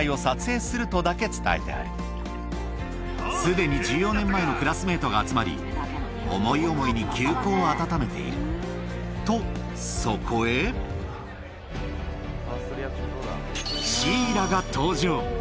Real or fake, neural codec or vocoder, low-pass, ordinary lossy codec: real; none; none; none